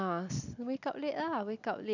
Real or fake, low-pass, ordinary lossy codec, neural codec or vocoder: real; 7.2 kHz; none; none